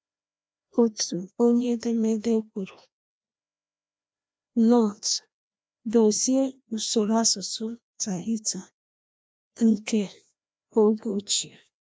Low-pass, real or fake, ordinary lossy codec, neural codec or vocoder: none; fake; none; codec, 16 kHz, 1 kbps, FreqCodec, larger model